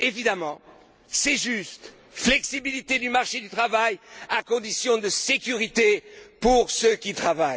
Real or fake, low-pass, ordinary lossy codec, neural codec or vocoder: real; none; none; none